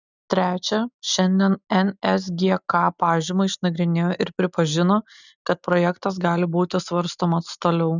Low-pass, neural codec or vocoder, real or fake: 7.2 kHz; none; real